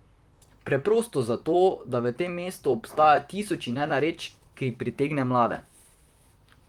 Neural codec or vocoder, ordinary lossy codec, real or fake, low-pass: vocoder, 44.1 kHz, 128 mel bands, Pupu-Vocoder; Opus, 32 kbps; fake; 19.8 kHz